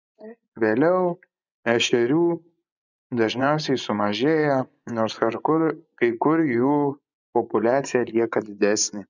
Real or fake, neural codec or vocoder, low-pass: fake; vocoder, 24 kHz, 100 mel bands, Vocos; 7.2 kHz